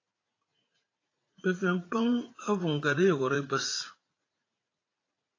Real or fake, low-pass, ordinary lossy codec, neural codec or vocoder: fake; 7.2 kHz; AAC, 32 kbps; vocoder, 44.1 kHz, 80 mel bands, Vocos